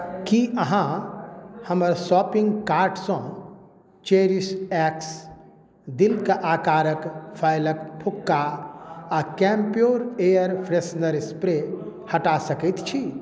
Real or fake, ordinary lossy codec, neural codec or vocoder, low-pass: real; none; none; none